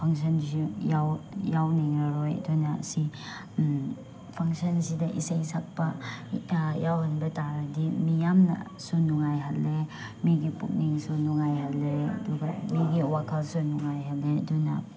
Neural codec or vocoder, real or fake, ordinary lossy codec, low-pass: none; real; none; none